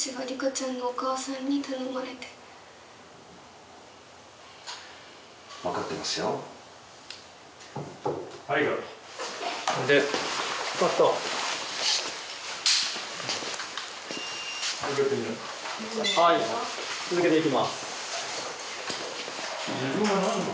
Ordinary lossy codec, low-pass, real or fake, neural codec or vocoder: none; none; real; none